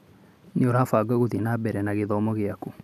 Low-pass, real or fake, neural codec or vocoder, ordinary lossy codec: 14.4 kHz; real; none; none